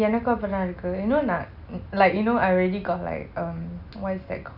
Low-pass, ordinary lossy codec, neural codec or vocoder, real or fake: 5.4 kHz; none; none; real